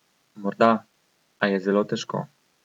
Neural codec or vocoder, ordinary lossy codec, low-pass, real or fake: none; none; 19.8 kHz; real